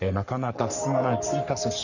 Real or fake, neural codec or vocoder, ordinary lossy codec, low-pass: fake; codec, 44.1 kHz, 3.4 kbps, Pupu-Codec; none; 7.2 kHz